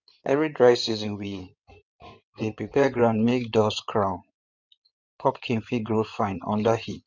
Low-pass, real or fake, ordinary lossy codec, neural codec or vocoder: 7.2 kHz; fake; none; codec, 16 kHz in and 24 kHz out, 2.2 kbps, FireRedTTS-2 codec